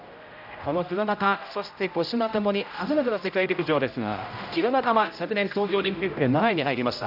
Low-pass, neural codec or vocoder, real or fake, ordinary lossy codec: 5.4 kHz; codec, 16 kHz, 0.5 kbps, X-Codec, HuBERT features, trained on general audio; fake; none